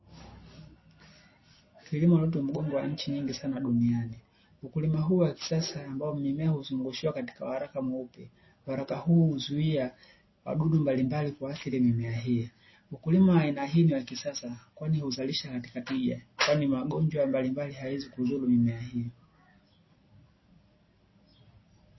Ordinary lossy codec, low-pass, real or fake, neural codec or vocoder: MP3, 24 kbps; 7.2 kHz; real; none